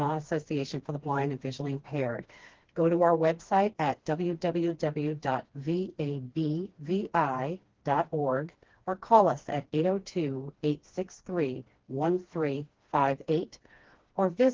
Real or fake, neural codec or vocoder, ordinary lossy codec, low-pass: fake; codec, 16 kHz, 2 kbps, FreqCodec, smaller model; Opus, 16 kbps; 7.2 kHz